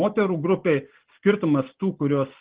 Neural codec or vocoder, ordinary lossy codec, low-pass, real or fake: none; Opus, 16 kbps; 3.6 kHz; real